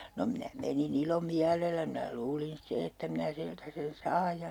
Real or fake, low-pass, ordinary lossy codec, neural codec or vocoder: real; 19.8 kHz; none; none